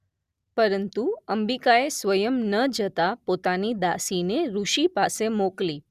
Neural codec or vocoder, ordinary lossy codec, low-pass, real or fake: none; Opus, 64 kbps; 14.4 kHz; real